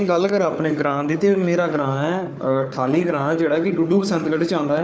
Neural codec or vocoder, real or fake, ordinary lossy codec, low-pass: codec, 16 kHz, 4 kbps, FunCodec, trained on Chinese and English, 50 frames a second; fake; none; none